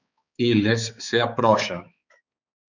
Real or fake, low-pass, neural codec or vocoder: fake; 7.2 kHz; codec, 16 kHz, 4 kbps, X-Codec, HuBERT features, trained on balanced general audio